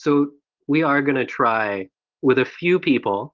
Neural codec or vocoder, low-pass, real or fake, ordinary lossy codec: none; 7.2 kHz; real; Opus, 16 kbps